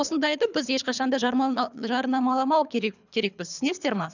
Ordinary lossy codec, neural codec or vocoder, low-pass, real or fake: none; codec, 24 kHz, 3 kbps, HILCodec; 7.2 kHz; fake